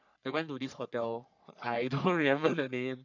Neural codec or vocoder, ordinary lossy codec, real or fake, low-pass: codec, 44.1 kHz, 3.4 kbps, Pupu-Codec; none; fake; 7.2 kHz